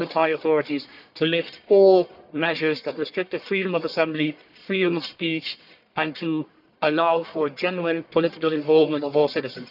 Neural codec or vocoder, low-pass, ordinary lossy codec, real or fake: codec, 44.1 kHz, 1.7 kbps, Pupu-Codec; 5.4 kHz; none; fake